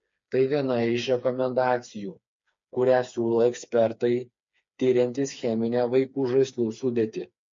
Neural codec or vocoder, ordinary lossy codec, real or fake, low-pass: codec, 16 kHz, 4 kbps, FreqCodec, smaller model; MP3, 48 kbps; fake; 7.2 kHz